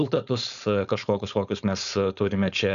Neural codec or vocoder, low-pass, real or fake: codec, 16 kHz, 4.8 kbps, FACodec; 7.2 kHz; fake